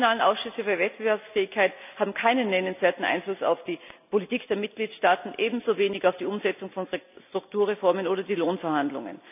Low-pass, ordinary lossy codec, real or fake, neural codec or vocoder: 3.6 kHz; none; real; none